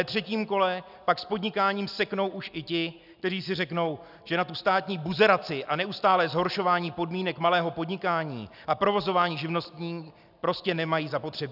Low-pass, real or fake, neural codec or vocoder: 5.4 kHz; real; none